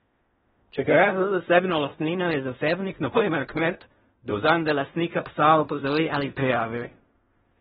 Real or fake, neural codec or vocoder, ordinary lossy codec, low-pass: fake; codec, 16 kHz in and 24 kHz out, 0.4 kbps, LongCat-Audio-Codec, fine tuned four codebook decoder; AAC, 16 kbps; 10.8 kHz